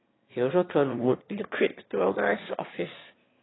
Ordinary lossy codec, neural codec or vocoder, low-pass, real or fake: AAC, 16 kbps; autoencoder, 22.05 kHz, a latent of 192 numbers a frame, VITS, trained on one speaker; 7.2 kHz; fake